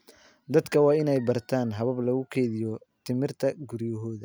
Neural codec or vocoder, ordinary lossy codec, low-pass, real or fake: none; none; none; real